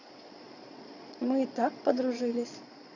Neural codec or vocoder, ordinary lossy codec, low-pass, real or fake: vocoder, 22.05 kHz, 80 mel bands, Vocos; none; 7.2 kHz; fake